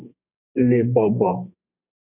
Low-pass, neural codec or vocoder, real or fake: 3.6 kHz; codec, 32 kHz, 1.9 kbps, SNAC; fake